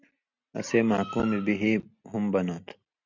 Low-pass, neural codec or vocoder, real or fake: 7.2 kHz; none; real